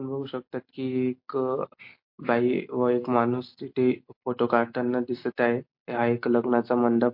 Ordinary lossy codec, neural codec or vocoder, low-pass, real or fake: MP3, 32 kbps; none; 5.4 kHz; real